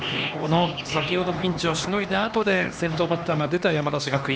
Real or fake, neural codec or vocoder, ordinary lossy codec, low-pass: fake; codec, 16 kHz, 2 kbps, X-Codec, HuBERT features, trained on LibriSpeech; none; none